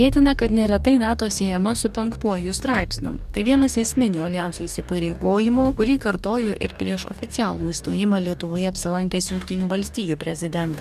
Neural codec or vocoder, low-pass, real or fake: codec, 44.1 kHz, 2.6 kbps, DAC; 14.4 kHz; fake